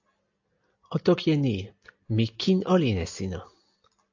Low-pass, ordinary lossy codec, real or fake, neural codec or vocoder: 7.2 kHz; AAC, 48 kbps; real; none